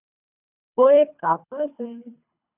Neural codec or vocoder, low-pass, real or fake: codec, 24 kHz, 3 kbps, HILCodec; 3.6 kHz; fake